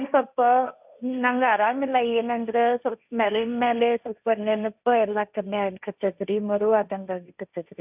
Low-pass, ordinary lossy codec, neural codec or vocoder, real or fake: 3.6 kHz; none; codec, 16 kHz, 1.1 kbps, Voila-Tokenizer; fake